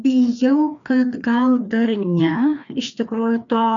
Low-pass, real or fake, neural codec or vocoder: 7.2 kHz; fake; codec, 16 kHz, 2 kbps, FreqCodec, larger model